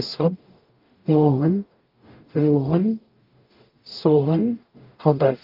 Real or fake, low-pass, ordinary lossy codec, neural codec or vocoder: fake; 5.4 kHz; Opus, 32 kbps; codec, 44.1 kHz, 0.9 kbps, DAC